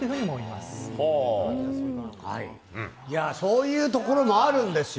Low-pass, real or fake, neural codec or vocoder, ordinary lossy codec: none; real; none; none